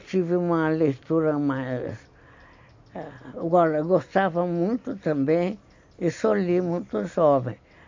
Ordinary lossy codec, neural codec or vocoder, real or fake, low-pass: MP3, 48 kbps; none; real; 7.2 kHz